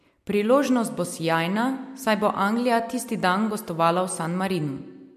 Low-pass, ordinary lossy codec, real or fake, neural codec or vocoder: 14.4 kHz; MP3, 64 kbps; real; none